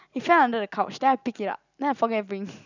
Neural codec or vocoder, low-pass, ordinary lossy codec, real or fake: none; 7.2 kHz; none; real